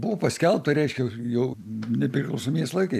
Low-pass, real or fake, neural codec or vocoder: 14.4 kHz; real; none